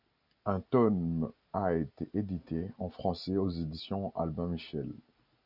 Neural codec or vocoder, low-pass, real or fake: none; 5.4 kHz; real